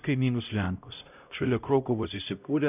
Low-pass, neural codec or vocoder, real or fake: 3.6 kHz; codec, 16 kHz, 0.5 kbps, X-Codec, HuBERT features, trained on LibriSpeech; fake